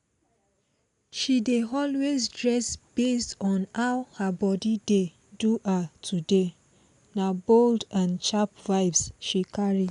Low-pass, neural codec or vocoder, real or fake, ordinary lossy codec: 10.8 kHz; none; real; none